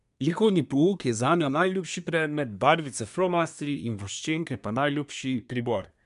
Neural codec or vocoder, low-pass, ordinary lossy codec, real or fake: codec, 24 kHz, 1 kbps, SNAC; 10.8 kHz; none; fake